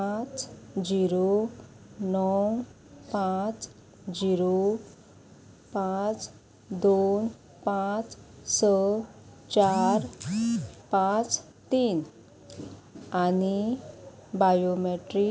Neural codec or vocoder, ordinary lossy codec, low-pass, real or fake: none; none; none; real